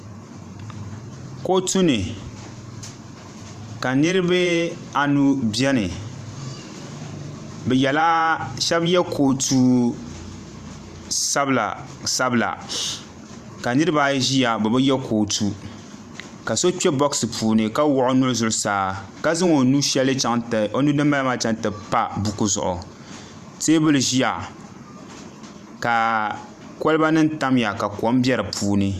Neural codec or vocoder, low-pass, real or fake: vocoder, 44.1 kHz, 128 mel bands every 512 samples, BigVGAN v2; 14.4 kHz; fake